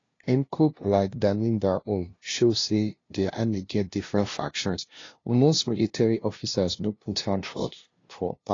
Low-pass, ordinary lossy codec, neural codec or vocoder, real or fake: 7.2 kHz; AAC, 32 kbps; codec, 16 kHz, 0.5 kbps, FunCodec, trained on LibriTTS, 25 frames a second; fake